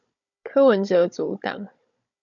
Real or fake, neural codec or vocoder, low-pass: fake; codec, 16 kHz, 16 kbps, FunCodec, trained on Chinese and English, 50 frames a second; 7.2 kHz